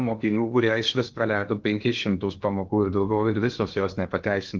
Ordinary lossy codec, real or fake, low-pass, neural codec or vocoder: Opus, 32 kbps; fake; 7.2 kHz; codec, 16 kHz in and 24 kHz out, 0.8 kbps, FocalCodec, streaming, 65536 codes